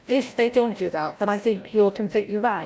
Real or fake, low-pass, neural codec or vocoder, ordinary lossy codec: fake; none; codec, 16 kHz, 0.5 kbps, FreqCodec, larger model; none